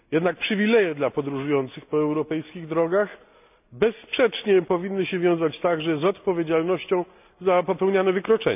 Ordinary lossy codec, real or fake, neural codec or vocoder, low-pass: none; real; none; 3.6 kHz